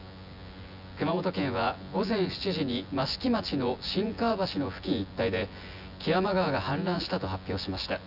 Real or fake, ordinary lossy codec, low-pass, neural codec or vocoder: fake; none; 5.4 kHz; vocoder, 24 kHz, 100 mel bands, Vocos